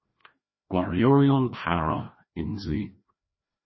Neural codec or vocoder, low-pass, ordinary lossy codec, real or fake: codec, 16 kHz, 1 kbps, FreqCodec, larger model; 7.2 kHz; MP3, 24 kbps; fake